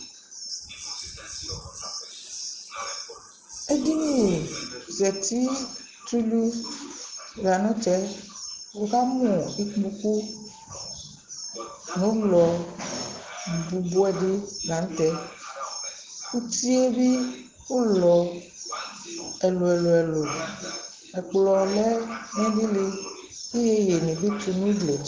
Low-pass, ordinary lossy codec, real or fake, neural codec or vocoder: 7.2 kHz; Opus, 16 kbps; real; none